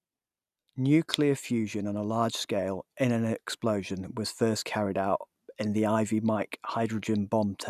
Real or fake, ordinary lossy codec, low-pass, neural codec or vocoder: real; none; 14.4 kHz; none